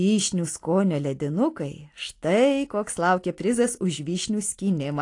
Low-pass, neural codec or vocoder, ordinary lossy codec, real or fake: 10.8 kHz; none; AAC, 48 kbps; real